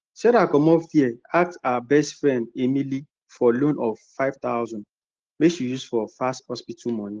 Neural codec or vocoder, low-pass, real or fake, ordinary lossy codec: none; 7.2 kHz; real; Opus, 16 kbps